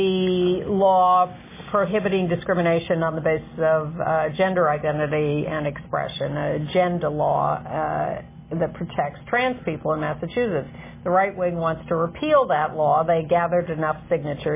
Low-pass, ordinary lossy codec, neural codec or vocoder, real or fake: 3.6 kHz; MP3, 16 kbps; none; real